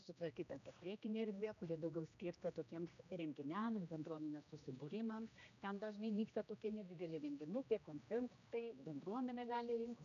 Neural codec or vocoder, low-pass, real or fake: codec, 16 kHz, 1 kbps, X-Codec, HuBERT features, trained on general audio; 7.2 kHz; fake